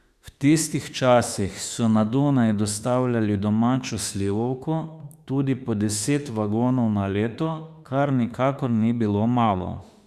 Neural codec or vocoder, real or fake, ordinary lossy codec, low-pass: autoencoder, 48 kHz, 32 numbers a frame, DAC-VAE, trained on Japanese speech; fake; Opus, 64 kbps; 14.4 kHz